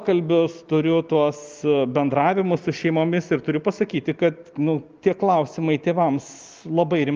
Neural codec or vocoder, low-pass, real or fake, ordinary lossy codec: none; 7.2 kHz; real; Opus, 32 kbps